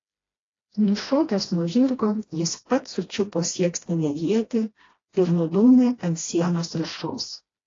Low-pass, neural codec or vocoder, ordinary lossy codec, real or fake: 7.2 kHz; codec, 16 kHz, 1 kbps, FreqCodec, smaller model; AAC, 32 kbps; fake